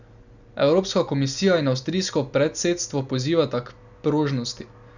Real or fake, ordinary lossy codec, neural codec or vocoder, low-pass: real; none; none; 7.2 kHz